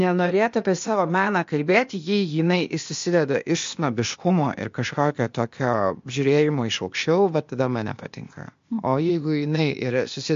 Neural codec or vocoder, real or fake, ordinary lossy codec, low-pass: codec, 16 kHz, 0.8 kbps, ZipCodec; fake; MP3, 48 kbps; 7.2 kHz